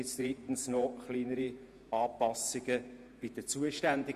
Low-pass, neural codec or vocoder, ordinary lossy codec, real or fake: 14.4 kHz; vocoder, 48 kHz, 128 mel bands, Vocos; Opus, 64 kbps; fake